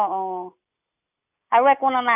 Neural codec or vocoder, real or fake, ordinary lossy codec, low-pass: none; real; none; 3.6 kHz